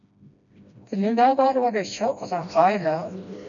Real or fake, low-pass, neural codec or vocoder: fake; 7.2 kHz; codec, 16 kHz, 1 kbps, FreqCodec, smaller model